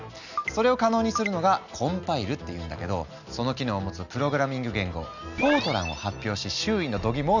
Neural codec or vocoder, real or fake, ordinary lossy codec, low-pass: none; real; none; 7.2 kHz